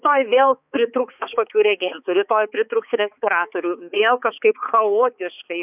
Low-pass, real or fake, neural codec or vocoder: 3.6 kHz; fake; codec, 16 kHz, 4 kbps, X-Codec, HuBERT features, trained on balanced general audio